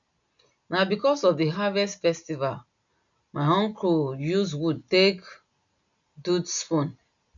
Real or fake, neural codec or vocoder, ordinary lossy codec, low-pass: real; none; none; 7.2 kHz